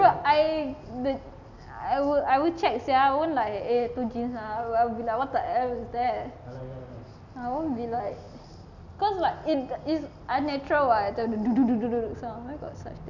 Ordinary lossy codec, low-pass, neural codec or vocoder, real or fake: none; 7.2 kHz; none; real